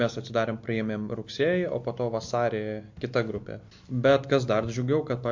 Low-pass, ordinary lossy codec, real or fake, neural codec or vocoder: 7.2 kHz; MP3, 48 kbps; real; none